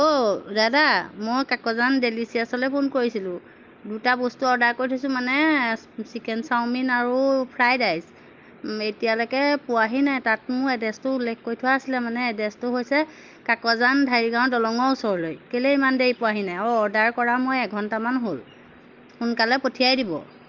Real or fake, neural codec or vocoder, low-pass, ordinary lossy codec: real; none; 7.2 kHz; Opus, 24 kbps